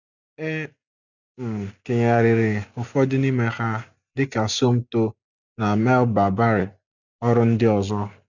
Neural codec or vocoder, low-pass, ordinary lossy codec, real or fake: none; 7.2 kHz; none; real